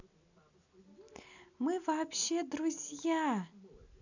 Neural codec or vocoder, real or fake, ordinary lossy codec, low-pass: none; real; none; 7.2 kHz